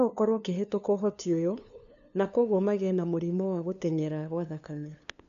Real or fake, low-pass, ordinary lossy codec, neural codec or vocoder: fake; 7.2 kHz; none; codec, 16 kHz, 2 kbps, FunCodec, trained on LibriTTS, 25 frames a second